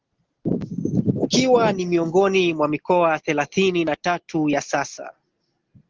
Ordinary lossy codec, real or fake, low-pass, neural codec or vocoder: Opus, 16 kbps; real; 7.2 kHz; none